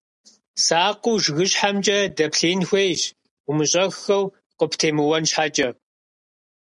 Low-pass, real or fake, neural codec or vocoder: 10.8 kHz; real; none